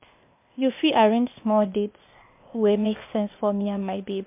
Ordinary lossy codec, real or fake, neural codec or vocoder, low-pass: MP3, 32 kbps; fake; codec, 16 kHz, 0.8 kbps, ZipCodec; 3.6 kHz